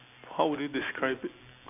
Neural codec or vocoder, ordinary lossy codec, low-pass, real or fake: none; none; 3.6 kHz; real